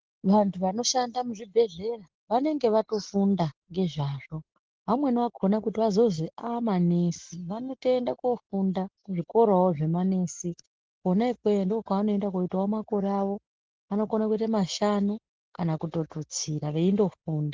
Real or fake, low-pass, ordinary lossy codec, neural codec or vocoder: real; 7.2 kHz; Opus, 16 kbps; none